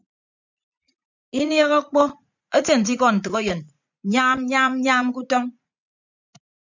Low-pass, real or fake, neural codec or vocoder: 7.2 kHz; fake; vocoder, 44.1 kHz, 80 mel bands, Vocos